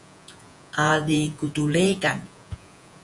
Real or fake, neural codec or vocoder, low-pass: fake; vocoder, 48 kHz, 128 mel bands, Vocos; 10.8 kHz